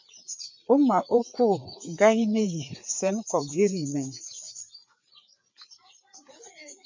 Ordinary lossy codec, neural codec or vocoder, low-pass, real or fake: MP3, 64 kbps; codec, 16 kHz, 4 kbps, FreqCodec, larger model; 7.2 kHz; fake